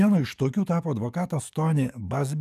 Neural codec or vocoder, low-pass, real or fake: codec, 44.1 kHz, 7.8 kbps, DAC; 14.4 kHz; fake